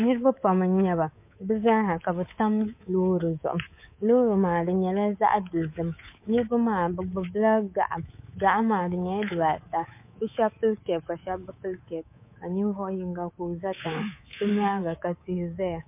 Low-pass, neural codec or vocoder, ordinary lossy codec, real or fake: 3.6 kHz; codec, 24 kHz, 3.1 kbps, DualCodec; MP3, 24 kbps; fake